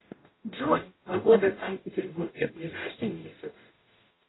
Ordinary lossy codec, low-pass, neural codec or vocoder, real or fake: AAC, 16 kbps; 7.2 kHz; codec, 44.1 kHz, 0.9 kbps, DAC; fake